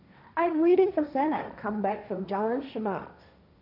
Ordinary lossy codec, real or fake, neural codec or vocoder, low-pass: none; fake; codec, 16 kHz, 1.1 kbps, Voila-Tokenizer; 5.4 kHz